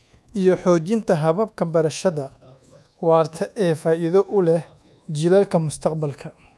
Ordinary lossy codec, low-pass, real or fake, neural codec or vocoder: none; none; fake; codec, 24 kHz, 1.2 kbps, DualCodec